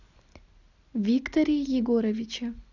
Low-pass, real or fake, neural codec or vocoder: 7.2 kHz; real; none